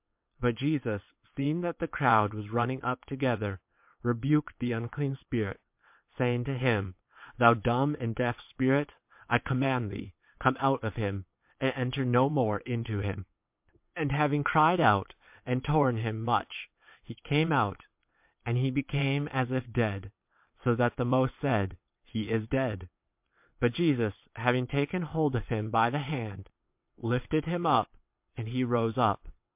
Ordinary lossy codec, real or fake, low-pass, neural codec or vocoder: MP3, 32 kbps; fake; 3.6 kHz; vocoder, 22.05 kHz, 80 mel bands, WaveNeXt